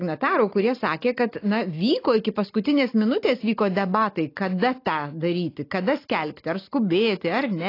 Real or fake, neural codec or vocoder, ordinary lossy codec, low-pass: real; none; AAC, 32 kbps; 5.4 kHz